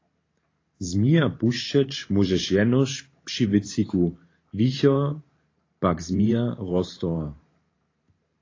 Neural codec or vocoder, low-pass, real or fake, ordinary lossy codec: vocoder, 44.1 kHz, 128 mel bands every 512 samples, BigVGAN v2; 7.2 kHz; fake; AAC, 32 kbps